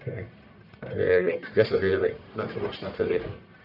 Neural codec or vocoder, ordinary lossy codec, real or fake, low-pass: codec, 44.1 kHz, 1.7 kbps, Pupu-Codec; none; fake; 5.4 kHz